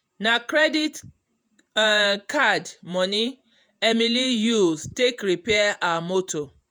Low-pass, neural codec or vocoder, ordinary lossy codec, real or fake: none; vocoder, 48 kHz, 128 mel bands, Vocos; none; fake